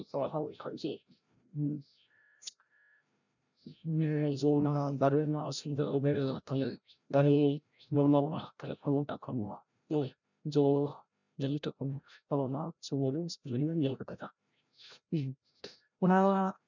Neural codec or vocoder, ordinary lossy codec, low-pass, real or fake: codec, 16 kHz, 0.5 kbps, FreqCodec, larger model; none; 7.2 kHz; fake